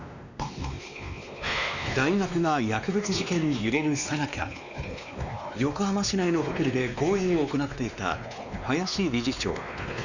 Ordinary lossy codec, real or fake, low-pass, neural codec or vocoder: none; fake; 7.2 kHz; codec, 16 kHz, 2 kbps, X-Codec, WavLM features, trained on Multilingual LibriSpeech